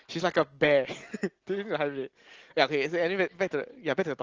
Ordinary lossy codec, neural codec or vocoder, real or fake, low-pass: Opus, 16 kbps; none; real; 7.2 kHz